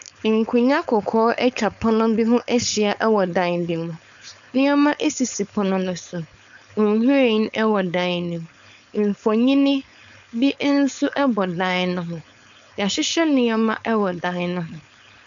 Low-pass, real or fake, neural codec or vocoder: 7.2 kHz; fake; codec, 16 kHz, 4.8 kbps, FACodec